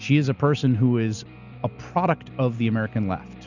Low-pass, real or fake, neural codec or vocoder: 7.2 kHz; real; none